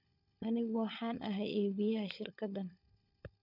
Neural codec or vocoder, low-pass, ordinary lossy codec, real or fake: codec, 16 kHz, 16 kbps, FunCodec, trained on LibriTTS, 50 frames a second; 5.4 kHz; none; fake